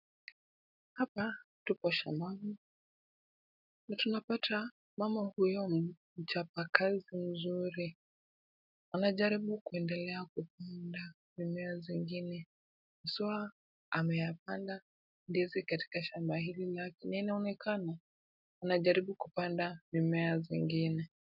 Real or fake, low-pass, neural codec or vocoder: real; 5.4 kHz; none